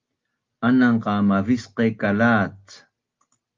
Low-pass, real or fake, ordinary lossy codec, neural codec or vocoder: 7.2 kHz; real; Opus, 32 kbps; none